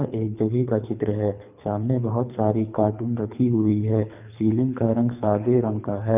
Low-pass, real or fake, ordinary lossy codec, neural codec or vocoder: 3.6 kHz; fake; none; codec, 24 kHz, 3 kbps, HILCodec